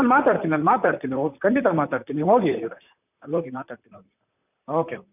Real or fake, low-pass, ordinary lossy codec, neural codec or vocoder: fake; 3.6 kHz; none; vocoder, 44.1 kHz, 128 mel bands, Pupu-Vocoder